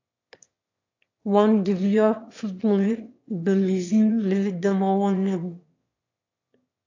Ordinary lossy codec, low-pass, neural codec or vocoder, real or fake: AAC, 48 kbps; 7.2 kHz; autoencoder, 22.05 kHz, a latent of 192 numbers a frame, VITS, trained on one speaker; fake